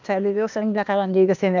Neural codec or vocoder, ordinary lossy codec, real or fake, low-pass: codec, 16 kHz, 0.8 kbps, ZipCodec; none; fake; 7.2 kHz